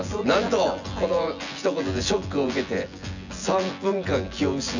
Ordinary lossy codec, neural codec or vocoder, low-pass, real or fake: none; vocoder, 24 kHz, 100 mel bands, Vocos; 7.2 kHz; fake